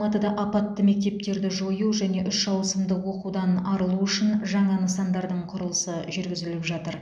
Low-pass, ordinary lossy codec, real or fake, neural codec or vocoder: none; none; real; none